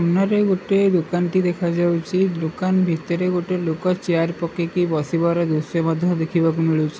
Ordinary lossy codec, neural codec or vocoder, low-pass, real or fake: none; none; none; real